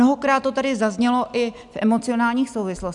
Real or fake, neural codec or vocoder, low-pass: real; none; 10.8 kHz